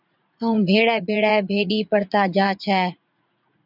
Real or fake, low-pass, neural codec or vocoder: fake; 5.4 kHz; vocoder, 44.1 kHz, 80 mel bands, Vocos